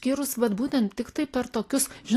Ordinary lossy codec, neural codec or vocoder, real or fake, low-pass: AAC, 48 kbps; none; real; 14.4 kHz